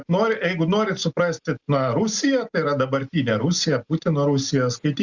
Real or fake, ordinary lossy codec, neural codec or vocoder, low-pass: real; Opus, 64 kbps; none; 7.2 kHz